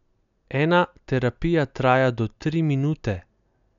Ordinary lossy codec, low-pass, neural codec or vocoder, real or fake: none; 7.2 kHz; none; real